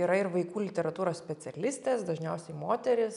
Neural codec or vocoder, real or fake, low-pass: none; real; 10.8 kHz